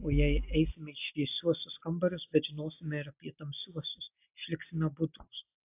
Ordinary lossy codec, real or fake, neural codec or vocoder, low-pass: AAC, 32 kbps; real; none; 3.6 kHz